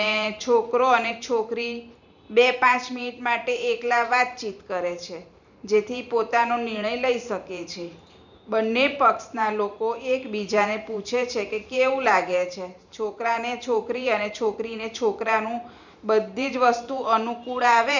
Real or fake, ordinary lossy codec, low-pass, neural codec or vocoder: fake; none; 7.2 kHz; vocoder, 44.1 kHz, 128 mel bands every 512 samples, BigVGAN v2